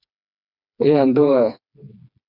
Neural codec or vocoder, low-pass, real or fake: codec, 16 kHz, 2 kbps, FreqCodec, smaller model; 5.4 kHz; fake